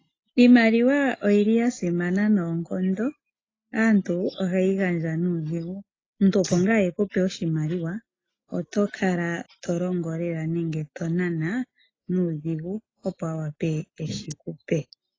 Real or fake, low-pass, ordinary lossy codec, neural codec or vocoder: real; 7.2 kHz; AAC, 32 kbps; none